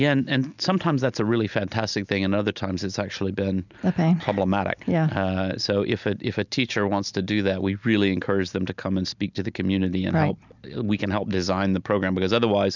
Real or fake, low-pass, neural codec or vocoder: real; 7.2 kHz; none